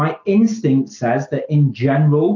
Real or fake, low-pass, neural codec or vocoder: real; 7.2 kHz; none